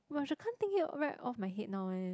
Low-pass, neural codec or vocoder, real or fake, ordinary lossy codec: none; none; real; none